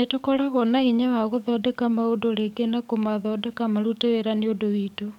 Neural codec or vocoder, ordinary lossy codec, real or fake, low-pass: codec, 44.1 kHz, 7.8 kbps, DAC; none; fake; 19.8 kHz